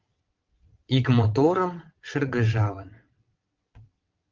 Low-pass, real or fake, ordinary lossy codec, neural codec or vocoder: 7.2 kHz; fake; Opus, 24 kbps; vocoder, 22.05 kHz, 80 mel bands, WaveNeXt